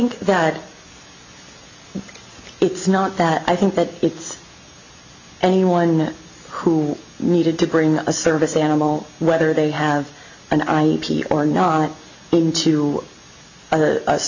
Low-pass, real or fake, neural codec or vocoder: 7.2 kHz; real; none